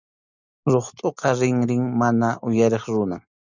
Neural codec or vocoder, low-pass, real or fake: none; 7.2 kHz; real